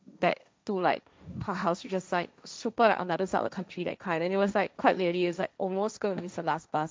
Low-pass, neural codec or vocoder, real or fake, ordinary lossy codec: none; codec, 16 kHz, 1.1 kbps, Voila-Tokenizer; fake; none